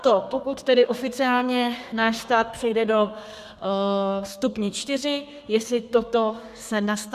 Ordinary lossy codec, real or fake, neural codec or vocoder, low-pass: AAC, 96 kbps; fake; codec, 32 kHz, 1.9 kbps, SNAC; 14.4 kHz